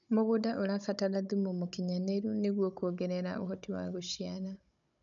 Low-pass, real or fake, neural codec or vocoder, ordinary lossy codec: 7.2 kHz; fake; codec, 16 kHz, 16 kbps, FunCodec, trained on Chinese and English, 50 frames a second; none